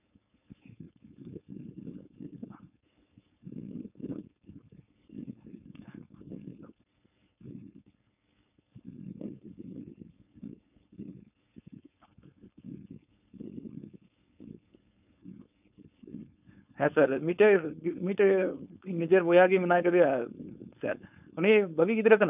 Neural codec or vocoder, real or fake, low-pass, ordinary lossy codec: codec, 16 kHz, 4.8 kbps, FACodec; fake; 3.6 kHz; none